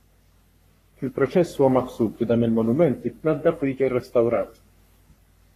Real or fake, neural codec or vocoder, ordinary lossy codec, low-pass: fake; codec, 44.1 kHz, 3.4 kbps, Pupu-Codec; AAC, 48 kbps; 14.4 kHz